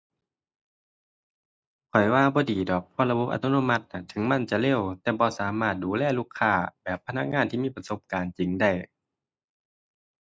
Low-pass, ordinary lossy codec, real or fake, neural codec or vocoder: none; none; real; none